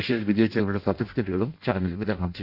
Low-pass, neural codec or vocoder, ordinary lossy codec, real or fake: 5.4 kHz; codec, 16 kHz in and 24 kHz out, 0.6 kbps, FireRedTTS-2 codec; none; fake